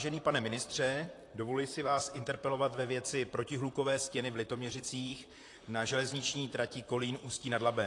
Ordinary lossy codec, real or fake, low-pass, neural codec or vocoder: AAC, 48 kbps; fake; 10.8 kHz; vocoder, 44.1 kHz, 128 mel bands, Pupu-Vocoder